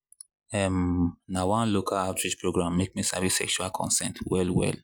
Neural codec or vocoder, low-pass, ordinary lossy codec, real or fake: none; none; none; real